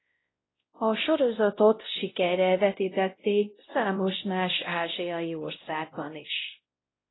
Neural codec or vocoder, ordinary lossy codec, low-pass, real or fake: codec, 16 kHz, 0.5 kbps, X-Codec, WavLM features, trained on Multilingual LibriSpeech; AAC, 16 kbps; 7.2 kHz; fake